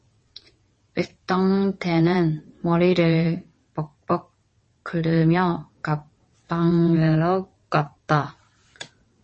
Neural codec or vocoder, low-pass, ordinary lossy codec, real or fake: vocoder, 22.05 kHz, 80 mel bands, WaveNeXt; 9.9 kHz; MP3, 32 kbps; fake